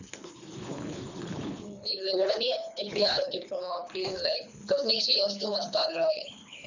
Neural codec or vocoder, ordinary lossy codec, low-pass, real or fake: codec, 24 kHz, 3 kbps, HILCodec; none; 7.2 kHz; fake